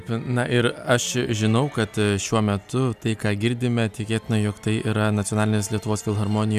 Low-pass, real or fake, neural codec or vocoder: 14.4 kHz; real; none